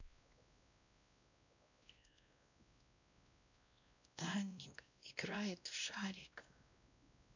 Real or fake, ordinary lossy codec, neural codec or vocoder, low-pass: fake; none; codec, 16 kHz, 1 kbps, X-Codec, WavLM features, trained on Multilingual LibriSpeech; 7.2 kHz